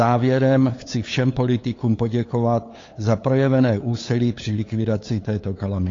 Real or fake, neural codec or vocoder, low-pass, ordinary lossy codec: fake; codec, 16 kHz, 8 kbps, FunCodec, trained on LibriTTS, 25 frames a second; 7.2 kHz; AAC, 32 kbps